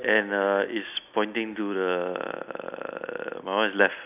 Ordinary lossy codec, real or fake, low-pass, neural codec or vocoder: none; real; 3.6 kHz; none